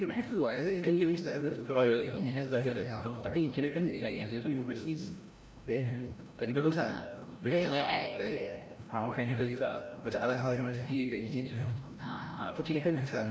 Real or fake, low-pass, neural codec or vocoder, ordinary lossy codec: fake; none; codec, 16 kHz, 0.5 kbps, FreqCodec, larger model; none